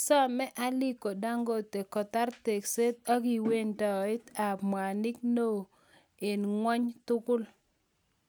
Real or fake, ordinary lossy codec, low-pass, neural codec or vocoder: real; none; none; none